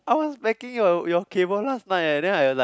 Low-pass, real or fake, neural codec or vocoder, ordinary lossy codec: none; real; none; none